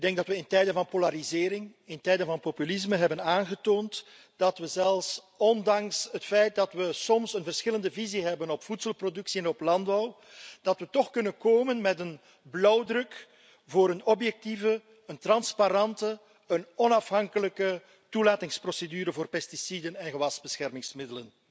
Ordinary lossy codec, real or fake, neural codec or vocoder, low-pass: none; real; none; none